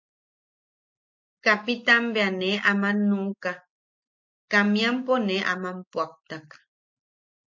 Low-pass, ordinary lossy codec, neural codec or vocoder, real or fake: 7.2 kHz; MP3, 48 kbps; none; real